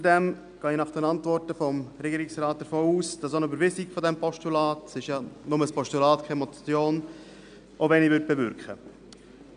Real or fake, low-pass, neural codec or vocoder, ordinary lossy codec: real; 9.9 kHz; none; none